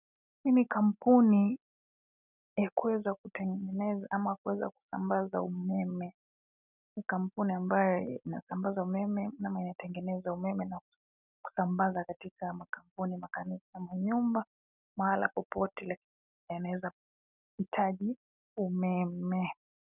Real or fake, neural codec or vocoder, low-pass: real; none; 3.6 kHz